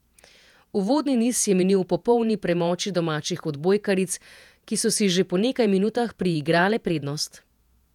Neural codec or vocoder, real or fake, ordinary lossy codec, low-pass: vocoder, 48 kHz, 128 mel bands, Vocos; fake; none; 19.8 kHz